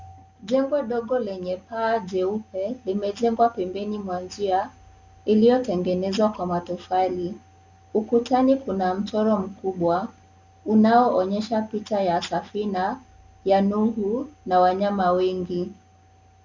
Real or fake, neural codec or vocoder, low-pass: real; none; 7.2 kHz